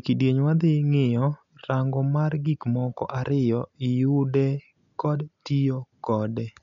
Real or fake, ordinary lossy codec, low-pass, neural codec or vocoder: real; none; 7.2 kHz; none